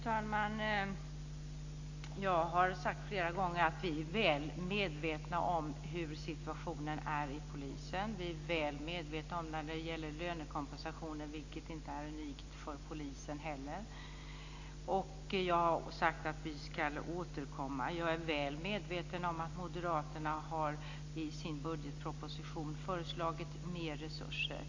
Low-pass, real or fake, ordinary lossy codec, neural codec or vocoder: 7.2 kHz; real; AAC, 48 kbps; none